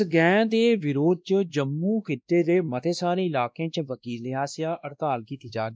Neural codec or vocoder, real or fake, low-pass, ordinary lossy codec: codec, 16 kHz, 1 kbps, X-Codec, WavLM features, trained on Multilingual LibriSpeech; fake; none; none